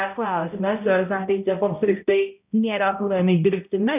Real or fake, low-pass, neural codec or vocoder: fake; 3.6 kHz; codec, 16 kHz, 0.5 kbps, X-Codec, HuBERT features, trained on balanced general audio